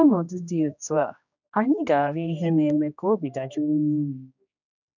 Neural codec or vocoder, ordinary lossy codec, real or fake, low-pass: codec, 16 kHz, 1 kbps, X-Codec, HuBERT features, trained on general audio; none; fake; 7.2 kHz